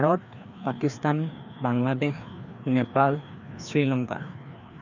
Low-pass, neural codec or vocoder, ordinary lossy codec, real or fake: 7.2 kHz; codec, 16 kHz, 2 kbps, FreqCodec, larger model; none; fake